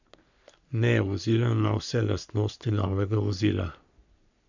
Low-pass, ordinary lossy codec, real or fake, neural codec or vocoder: 7.2 kHz; none; fake; codec, 44.1 kHz, 3.4 kbps, Pupu-Codec